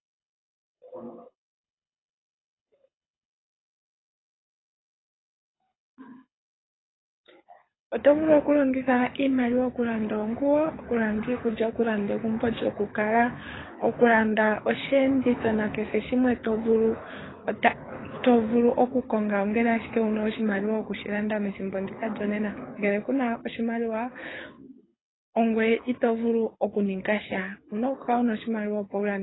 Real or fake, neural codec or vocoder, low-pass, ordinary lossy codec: fake; codec, 24 kHz, 6 kbps, HILCodec; 7.2 kHz; AAC, 16 kbps